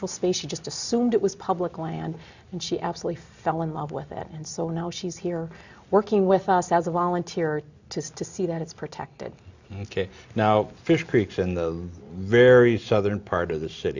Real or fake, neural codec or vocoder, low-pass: real; none; 7.2 kHz